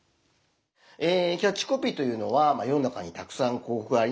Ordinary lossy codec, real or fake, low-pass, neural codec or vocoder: none; real; none; none